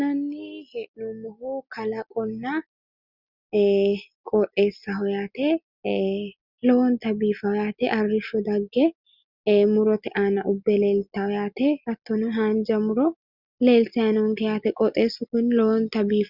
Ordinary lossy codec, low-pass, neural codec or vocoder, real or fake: Opus, 64 kbps; 5.4 kHz; none; real